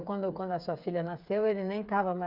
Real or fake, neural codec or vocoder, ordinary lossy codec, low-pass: fake; codec, 16 kHz, 8 kbps, FreqCodec, smaller model; Opus, 64 kbps; 5.4 kHz